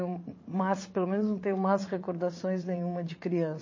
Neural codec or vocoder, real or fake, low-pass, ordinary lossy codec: vocoder, 44.1 kHz, 80 mel bands, Vocos; fake; 7.2 kHz; MP3, 32 kbps